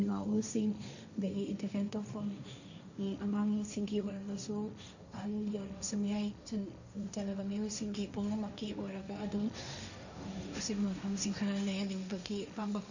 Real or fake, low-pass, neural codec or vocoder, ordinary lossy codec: fake; 7.2 kHz; codec, 16 kHz, 1.1 kbps, Voila-Tokenizer; none